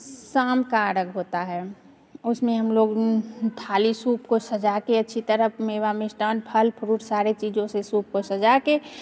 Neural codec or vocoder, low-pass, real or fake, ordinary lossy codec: none; none; real; none